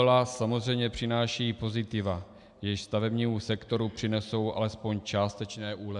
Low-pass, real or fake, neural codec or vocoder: 10.8 kHz; real; none